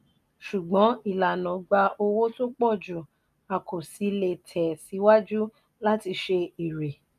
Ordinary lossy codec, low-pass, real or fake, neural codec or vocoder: none; 14.4 kHz; real; none